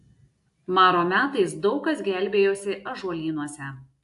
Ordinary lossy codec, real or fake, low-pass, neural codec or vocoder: AAC, 64 kbps; real; 10.8 kHz; none